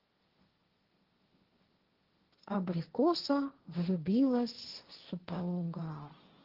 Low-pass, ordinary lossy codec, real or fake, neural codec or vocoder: 5.4 kHz; Opus, 16 kbps; fake; codec, 16 kHz, 1.1 kbps, Voila-Tokenizer